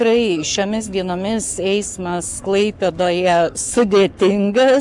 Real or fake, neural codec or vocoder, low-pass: fake; codec, 44.1 kHz, 7.8 kbps, Pupu-Codec; 10.8 kHz